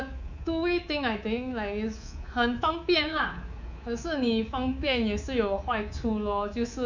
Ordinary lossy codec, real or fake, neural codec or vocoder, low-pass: none; fake; codec, 24 kHz, 3.1 kbps, DualCodec; 7.2 kHz